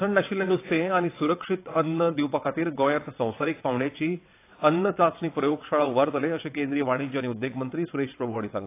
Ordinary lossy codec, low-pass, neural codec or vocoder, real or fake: AAC, 24 kbps; 3.6 kHz; vocoder, 22.05 kHz, 80 mel bands, WaveNeXt; fake